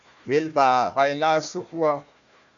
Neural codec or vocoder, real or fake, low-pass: codec, 16 kHz, 1 kbps, FunCodec, trained on Chinese and English, 50 frames a second; fake; 7.2 kHz